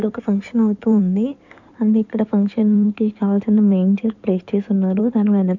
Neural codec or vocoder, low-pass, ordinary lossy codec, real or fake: codec, 16 kHz in and 24 kHz out, 2.2 kbps, FireRedTTS-2 codec; 7.2 kHz; none; fake